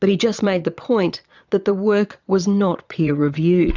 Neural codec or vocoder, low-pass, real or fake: vocoder, 22.05 kHz, 80 mel bands, Vocos; 7.2 kHz; fake